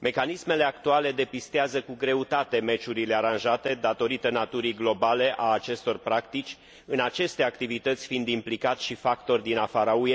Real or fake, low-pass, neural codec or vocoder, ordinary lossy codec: real; none; none; none